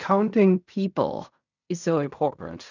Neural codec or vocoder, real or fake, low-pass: codec, 16 kHz in and 24 kHz out, 0.4 kbps, LongCat-Audio-Codec, fine tuned four codebook decoder; fake; 7.2 kHz